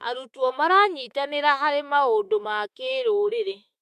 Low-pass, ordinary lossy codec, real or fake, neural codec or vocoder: 14.4 kHz; MP3, 96 kbps; fake; autoencoder, 48 kHz, 32 numbers a frame, DAC-VAE, trained on Japanese speech